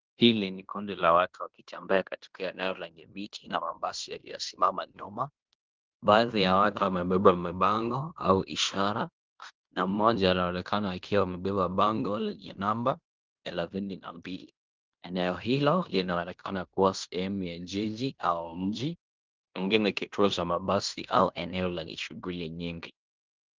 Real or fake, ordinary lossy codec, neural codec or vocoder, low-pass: fake; Opus, 32 kbps; codec, 16 kHz in and 24 kHz out, 0.9 kbps, LongCat-Audio-Codec, fine tuned four codebook decoder; 7.2 kHz